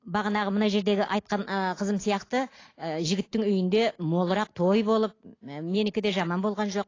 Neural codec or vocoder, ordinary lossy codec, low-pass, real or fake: none; AAC, 32 kbps; 7.2 kHz; real